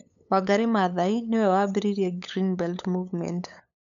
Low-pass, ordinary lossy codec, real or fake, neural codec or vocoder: 7.2 kHz; none; fake; codec, 16 kHz, 8 kbps, FunCodec, trained on LibriTTS, 25 frames a second